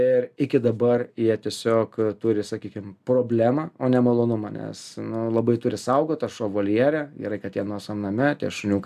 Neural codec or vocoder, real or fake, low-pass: none; real; 14.4 kHz